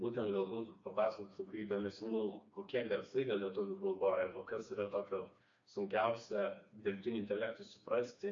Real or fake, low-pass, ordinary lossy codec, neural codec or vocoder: fake; 7.2 kHz; MP3, 48 kbps; codec, 16 kHz, 2 kbps, FreqCodec, smaller model